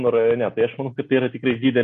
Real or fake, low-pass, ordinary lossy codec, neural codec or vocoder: real; 14.4 kHz; MP3, 48 kbps; none